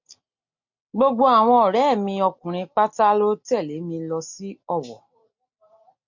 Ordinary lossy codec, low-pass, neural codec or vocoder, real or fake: MP3, 48 kbps; 7.2 kHz; none; real